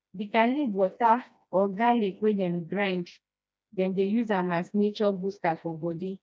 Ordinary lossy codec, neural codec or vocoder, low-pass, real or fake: none; codec, 16 kHz, 1 kbps, FreqCodec, smaller model; none; fake